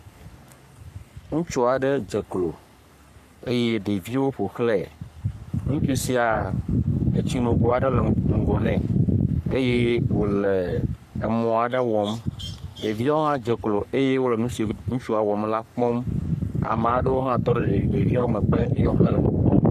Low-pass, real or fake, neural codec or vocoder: 14.4 kHz; fake; codec, 44.1 kHz, 3.4 kbps, Pupu-Codec